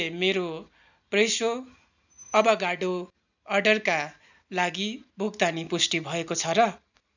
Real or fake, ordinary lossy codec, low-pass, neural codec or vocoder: real; none; 7.2 kHz; none